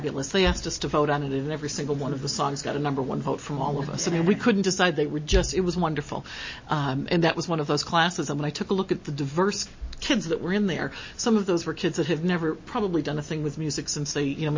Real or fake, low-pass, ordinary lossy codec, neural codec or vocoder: real; 7.2 kHz; MP3, 32 kbps; none